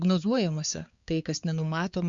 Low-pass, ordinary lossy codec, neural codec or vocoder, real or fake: 7.2 kHz; Opus, 64 kbps; codec, 16 kHz, 4 kbps, X-Codec, HuBERT features, trained on balanced general audio; fake